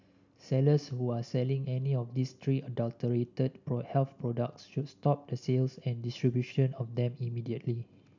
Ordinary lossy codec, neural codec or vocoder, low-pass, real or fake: none; none; 7.2 kHz; real